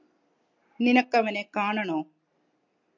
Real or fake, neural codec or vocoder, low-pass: real; none; 7.2 kHz